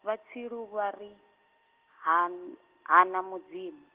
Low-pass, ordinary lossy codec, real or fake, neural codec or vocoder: 3.6 kHz; Opus, 16 kbps; real; none